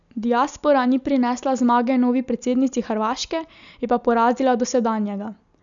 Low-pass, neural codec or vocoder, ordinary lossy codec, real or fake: 7.2 kHz; none; none; real